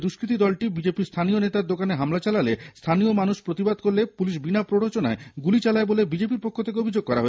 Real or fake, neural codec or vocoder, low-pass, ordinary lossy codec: real; none; none; none